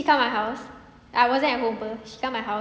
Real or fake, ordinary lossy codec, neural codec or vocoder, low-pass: real; none; none; none